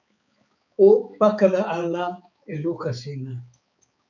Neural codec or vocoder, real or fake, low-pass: codec, 16 kHz, 4 kbps, X-Codec, HuBERT features, trained on balanced general audio; fake; 7.2 kHz